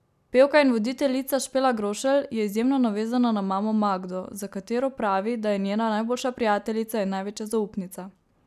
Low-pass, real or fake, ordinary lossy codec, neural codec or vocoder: 14.4 kHz; real; none; none